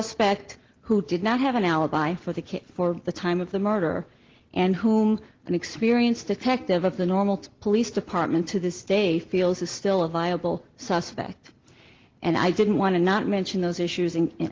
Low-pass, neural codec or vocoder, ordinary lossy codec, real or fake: 7.2 kHz; none; Opus, 16 kbps; real